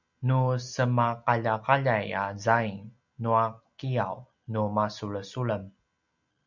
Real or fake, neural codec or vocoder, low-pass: real; none; 7.2 kHz